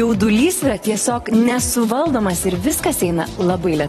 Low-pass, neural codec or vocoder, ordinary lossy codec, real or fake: 19.8 kHz; vocoder, 44.1 kHz, 128 mel bands every 256 samples, BigVGAN v2; AAC, 32 kbps; fake